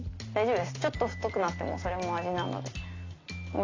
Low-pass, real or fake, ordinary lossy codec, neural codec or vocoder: 7.2 kHz; real; AAC, 32 kbps; none